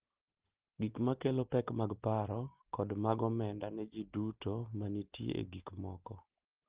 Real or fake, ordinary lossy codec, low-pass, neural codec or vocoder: real; Opus, 16 kbps; 3.6 kHz; none